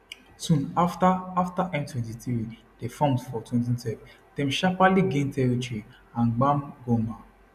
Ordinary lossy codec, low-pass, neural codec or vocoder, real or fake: none; 14.4 kHz; none; real